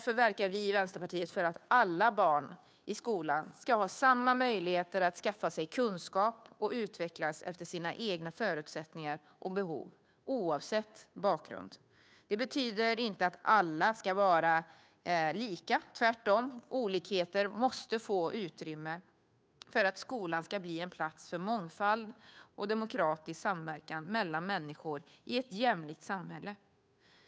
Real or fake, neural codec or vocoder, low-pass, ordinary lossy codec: fake; codec, 16 kHz, 2 kbps, FunCodec, trained on Chinese and English, 25 frames a second; none; none